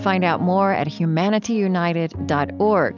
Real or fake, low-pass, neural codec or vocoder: real; 7.2 kHz; none